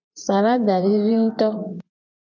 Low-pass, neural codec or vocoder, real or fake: 7.2 kHz; vocoder, 24 kHz, 100 mel bands, Vocos; fake